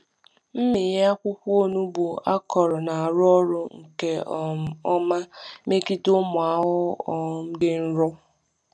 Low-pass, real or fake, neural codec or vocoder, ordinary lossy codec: none; real; none; none